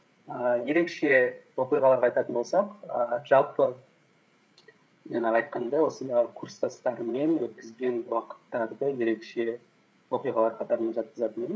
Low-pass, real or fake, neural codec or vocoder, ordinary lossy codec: none; fake; codec, 16 kHz, 8 kbps, FreqCodec, larger model; none